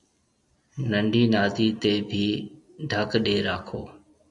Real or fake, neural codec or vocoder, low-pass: real; none; 10.8 kHz